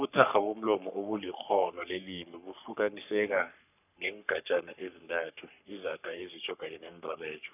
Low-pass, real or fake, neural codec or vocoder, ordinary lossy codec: 3.6 kHz; fake; codec, 44.1 kHz, 3.4 kbps, Pupu-Codec; none